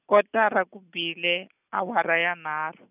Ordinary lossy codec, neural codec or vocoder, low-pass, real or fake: none; none; 3.6 kHz; real